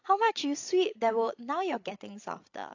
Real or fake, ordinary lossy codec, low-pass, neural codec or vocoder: fake; AAC, 48 kbps; 7.2 kHz; codec, 16 kHz, 16 kbps, FreqCodec, larger model